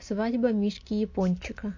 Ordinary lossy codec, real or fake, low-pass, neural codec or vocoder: MP3, 48 kbps; real; 7.2 kHz; none